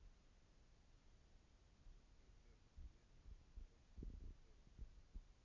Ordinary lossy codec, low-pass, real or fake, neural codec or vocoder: none; 7.2 kHz; real; none